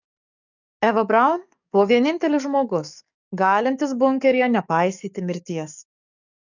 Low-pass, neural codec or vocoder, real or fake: 7.2 kHz; codec, 44.1 kHz, 7.8 kbps, DAC; fake